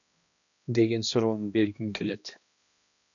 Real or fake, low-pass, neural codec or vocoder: fake; 7.2 kHz; codec, 16 kHz, 1 kbps, X-Codec, HuBERT features, trained on balanced general audio